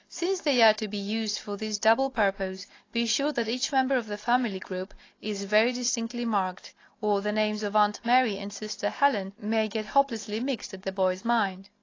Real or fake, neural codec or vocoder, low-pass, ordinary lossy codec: real; none; 7.2 kHz; AAC, 32 kbps